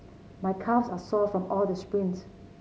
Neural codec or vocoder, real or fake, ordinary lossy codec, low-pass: none; real; none; none